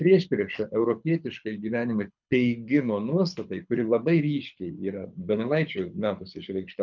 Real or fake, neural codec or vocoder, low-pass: fake; codec, 24 kHz, 6 kbps, HILCodec; 7.2 kHz